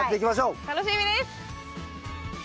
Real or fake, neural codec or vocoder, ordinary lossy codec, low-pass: real; none; none; none